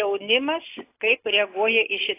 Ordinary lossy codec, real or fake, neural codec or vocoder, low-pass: AAC, 24 kbps; real; none; 3.6 kHz